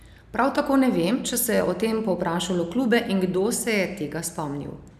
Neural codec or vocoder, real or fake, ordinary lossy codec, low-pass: none; real; none; 14.4 kHz